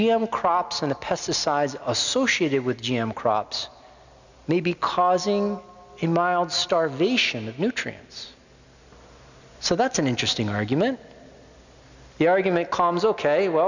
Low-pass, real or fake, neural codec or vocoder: 7.2 kHz; real; none